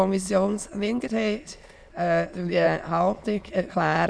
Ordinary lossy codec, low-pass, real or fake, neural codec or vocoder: none; none; fake; autoencoder, 22.05 kHz, a latent of 192 numbers a frame, VITS, trained on many speakers